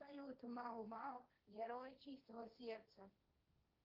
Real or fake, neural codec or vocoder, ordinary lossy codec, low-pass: fake; codec, 16 kHz, 1.1 kbps, Voila-Tokenizer; Opus, 24 kbps; 5.4 kHz